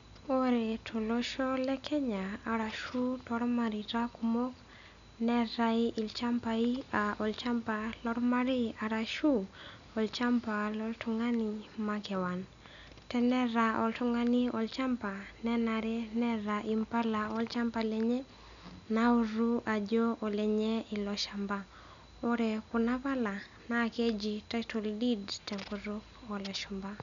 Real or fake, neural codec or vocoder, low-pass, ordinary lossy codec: real; none; 7.2 kHz; MP3, 96 kbps